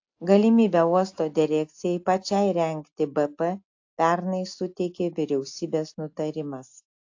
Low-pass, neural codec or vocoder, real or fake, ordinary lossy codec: 7.2 kHz; none; real; AAC, 48 kbps